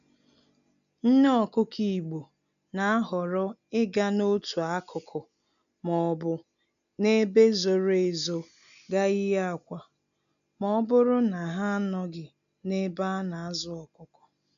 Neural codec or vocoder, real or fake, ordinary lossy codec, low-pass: none; real; none; 7.2 kHz